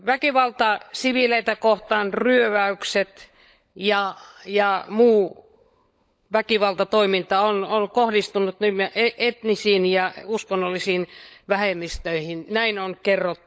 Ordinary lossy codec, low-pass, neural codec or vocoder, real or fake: none; none; codec, 16 kHz, 16 kbps, FunCodec, trained on LibriTTS, 50 frames a second; fake